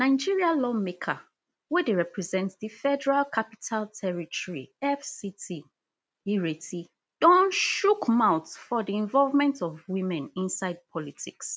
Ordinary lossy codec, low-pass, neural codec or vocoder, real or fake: none; none; none; real